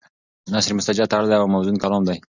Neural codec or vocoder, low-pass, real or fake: none; 7.2 kHz; real